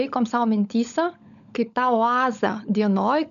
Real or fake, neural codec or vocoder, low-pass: fake; codec, 16 kHz, 16 kbps, FunCodec, trained on LibriTTS, 50 frames a second; 7.2 kHz